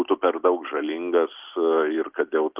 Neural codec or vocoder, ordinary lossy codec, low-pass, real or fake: none; Opus, 32 kbps; 3.6 kHz; real